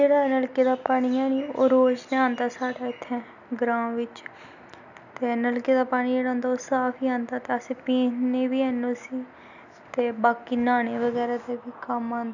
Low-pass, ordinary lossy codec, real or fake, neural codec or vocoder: 7.2 kHz; none; real; none